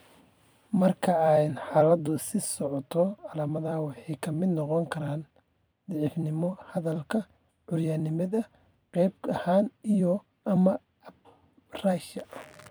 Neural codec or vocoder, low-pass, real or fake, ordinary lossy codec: vocoder, 44.1 kHz, 128 mel bands every 256 samples, BigVGAN v2; none; fake; none